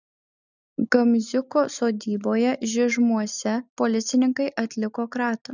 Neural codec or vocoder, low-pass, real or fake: none; 7.2 kHz; real